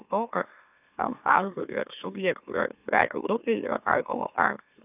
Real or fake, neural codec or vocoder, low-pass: fake; autoencoder, 44.1 kHz, a latent of 192 numbers a frame, MeloTTS; 3.6 kHz